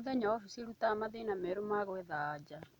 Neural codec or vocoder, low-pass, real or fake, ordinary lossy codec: none; 19.8 kHz; real; MP3, 96 kbps